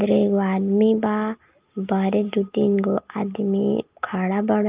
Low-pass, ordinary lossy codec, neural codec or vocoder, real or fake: 3.6 kHz; Opus, 24 kbps; none; real